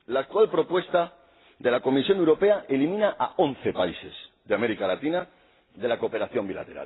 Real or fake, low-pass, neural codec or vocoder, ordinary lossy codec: real; 7.2 kHz; none; AAC, 16 kbps